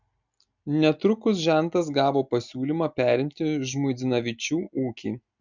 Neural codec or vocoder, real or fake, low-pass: none; real; 7.2 kHz